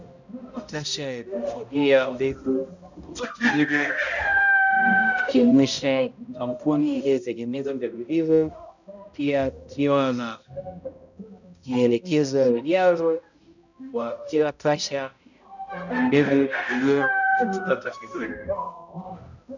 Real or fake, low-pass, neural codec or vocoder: fake; 7.2 kHz; codec, 16 kHz, 0.5 kbps, X-Codec, HuBERT features, trained on balanced general audio